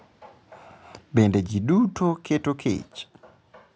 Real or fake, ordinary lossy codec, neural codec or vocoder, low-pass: real; none; none; none